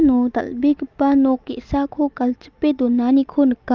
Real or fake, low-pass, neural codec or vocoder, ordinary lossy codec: real; 7.2 kHz; none; Opus, 32 kbps